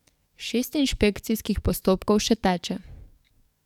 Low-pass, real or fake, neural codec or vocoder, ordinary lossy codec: 19.8 kHz; fake; codec, 44.1 kHz, 7.8 kbps, DAC; none